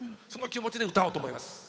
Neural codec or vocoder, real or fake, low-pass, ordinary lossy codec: codec, 16 kHz, 8 kbps, FunCodec, trained on Chinese and English, 25 frames a second; fake; none; none